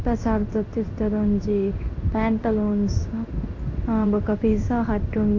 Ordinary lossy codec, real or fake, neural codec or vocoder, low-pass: AAC, 48 kbps; fake; codec, 16 kHz in and 24 kHz out, 1 kbps, XY-Tokenizer; 7.2 kHz